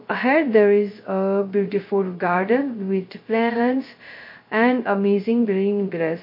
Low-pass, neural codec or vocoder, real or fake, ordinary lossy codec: 5.4 kHz; codec, 16 kHz, 0.2 kbps, FocalCodec; fake; MP3, 32 kbps